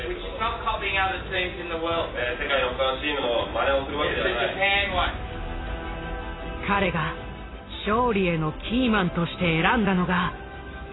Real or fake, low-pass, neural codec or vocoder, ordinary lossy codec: real; 7.2 kHz; none; AAC, 16 kbps